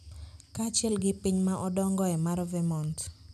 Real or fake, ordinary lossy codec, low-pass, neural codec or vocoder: real; none; 14.4 kHz; none